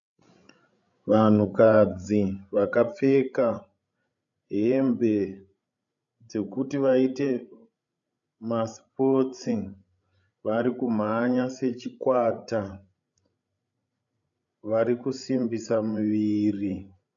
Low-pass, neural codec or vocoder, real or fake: 7.2 kHz; codec, 16 kHz, 16 kbps, FreqCodec, larger model; fake